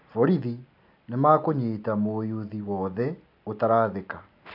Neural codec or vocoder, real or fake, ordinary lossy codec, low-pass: none; real; none; 5.4 kHz